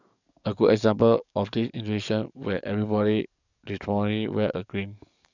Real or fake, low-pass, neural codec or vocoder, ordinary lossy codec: fake; 7.2 kHz; codec, 44.1 kHz, 7.8 kbps, DAC; none